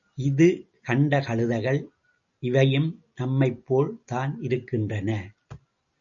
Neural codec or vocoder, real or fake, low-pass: none; real; 7.2 kHz